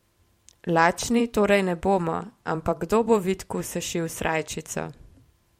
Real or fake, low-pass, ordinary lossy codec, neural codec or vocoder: fake; 19.8 kHz; MP3, 64 kbps; vocoder, 44.1 kHz, 128 mel bands every 512 samples, BigVGAN v2